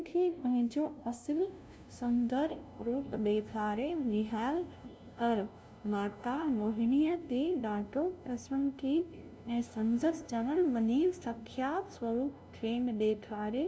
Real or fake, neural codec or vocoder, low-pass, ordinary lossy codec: fake; codec, 16 kHz, 0.5 kbps, FunCodec, trained on LibriTTS, 25 frames a second; none; none